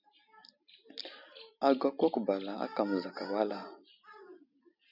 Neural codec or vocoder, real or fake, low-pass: none; real; 5.4 kHz